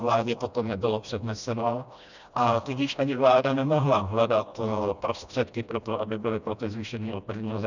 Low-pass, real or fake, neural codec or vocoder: 7.2 kHz; fake; codec, 16 kHz, 1 kbps, FreqCodec, smaller model